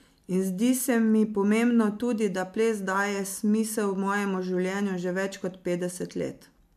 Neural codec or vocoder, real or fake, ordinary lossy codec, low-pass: none; real; MP3, 96 kbps; 14.4 kHz